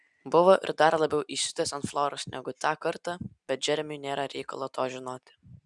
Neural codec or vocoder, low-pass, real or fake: none; 10.8 kHz; real